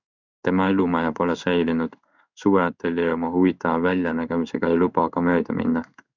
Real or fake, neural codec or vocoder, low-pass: fake; codec, 16 kHz in and 24 kHz out, 1 kbps, XY-Tokenizer; 7.2 kHz